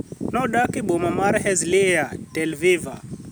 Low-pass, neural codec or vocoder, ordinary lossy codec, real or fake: none; none; none; real